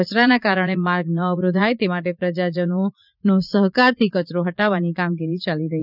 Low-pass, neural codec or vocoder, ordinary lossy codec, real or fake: 5.4 kHz; vocoder, 44.1 kHz, 80 mel bands, Vocos; none; fake